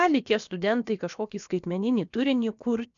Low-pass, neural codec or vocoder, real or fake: 7.2 kHz; codec, 16 kHz, about 1 kbps, DyCAST, with the encoder's durations; fake